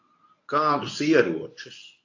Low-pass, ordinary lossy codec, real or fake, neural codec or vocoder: 7.2 kHz; AAC, 48 kbps; fake; codec, 24 kHz, 0.9 kbps, WavTokenizer, medium speech release version 1